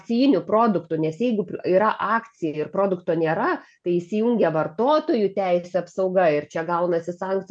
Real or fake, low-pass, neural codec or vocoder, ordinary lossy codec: real; 9.9 kHz; none; MP3, 64 kbps